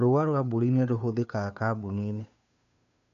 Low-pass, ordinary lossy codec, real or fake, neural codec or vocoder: 7.2 kHz; none; fake; codec, 16 kHz, 2 kbps, FunCodec, trained on Chinese and English, 25 frames a second